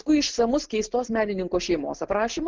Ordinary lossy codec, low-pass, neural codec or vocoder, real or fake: Opus, 16 kbps; 7.2 kHz; none; real